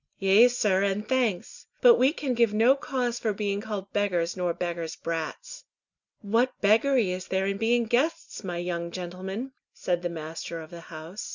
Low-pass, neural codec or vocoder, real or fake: 7.2 kHz; none; real